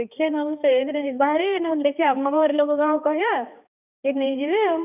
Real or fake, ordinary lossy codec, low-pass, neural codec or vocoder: fake; none; 3.6 kHz; codec, 16 kHz, 2 kbps, X-Codec, HuBERT features, trained on balanced general audio